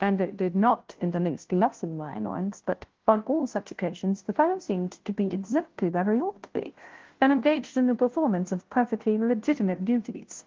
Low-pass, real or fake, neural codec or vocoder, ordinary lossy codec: 7.2 kHz; fake; codec, 16 kHz, 0.5 kbps, FunCodec, trained on Chinese and English, 25 frames a second; Opus, 16 kbps